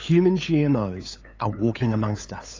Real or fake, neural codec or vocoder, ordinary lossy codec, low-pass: fake; codec, 16 kHz, 8 kbps, FunCodec, trained on LibriTTS, 25 frames a second; AAC, 32 kbps; 7.2 kHz